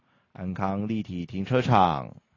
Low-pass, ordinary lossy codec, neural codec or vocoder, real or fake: 7.2 kHz; AAC, 32 kbps; none; real